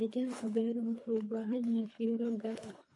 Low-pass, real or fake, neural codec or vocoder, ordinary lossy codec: 10.8 kHz; fake; codec, 24 kHz, 3 kbps, HILCodec; MP3, 48 kbps